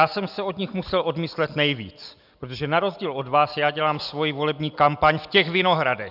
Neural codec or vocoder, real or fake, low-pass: none; real; 5.4 kHz